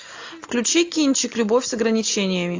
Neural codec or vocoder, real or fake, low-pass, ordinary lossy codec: none; real; 7.2 kHz; AAC, 48 kbps